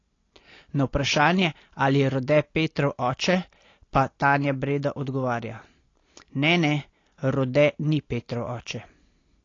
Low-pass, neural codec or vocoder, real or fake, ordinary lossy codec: 7.2 kHz; none; real; AAC, 32 kbps